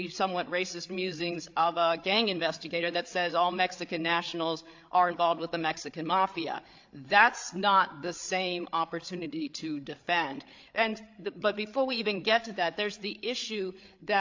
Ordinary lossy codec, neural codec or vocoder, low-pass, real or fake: AAC, 48 kbps; codec, 16 kHz, 8 kbps, FreqCodec, larger model; 7.2 kHz; fake